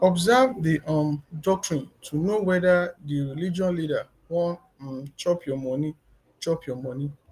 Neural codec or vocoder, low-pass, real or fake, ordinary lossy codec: none; 14.4 kHz; real; Opus, 32 kbps